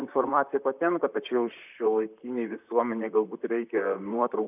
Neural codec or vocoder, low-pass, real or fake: vocoder, 44.1 kHz, 128 mel bands, Pupu-Vocoder; 3.6 kHz; fake